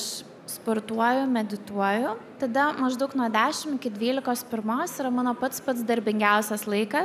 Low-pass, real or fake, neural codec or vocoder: 14.4 kHz; real; none